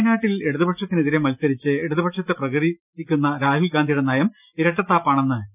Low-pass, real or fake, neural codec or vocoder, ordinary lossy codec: 3.6 kHz; real; none; none